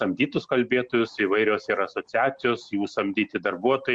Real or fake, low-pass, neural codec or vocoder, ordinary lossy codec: real; 9.9 kHz; none; MP3, 64 kbps